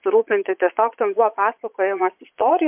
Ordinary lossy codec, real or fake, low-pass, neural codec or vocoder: MP3, 32 kbps; fake; 3.6 kHz; vocoder, 22.05 kHz, 80 mel bands, Vocos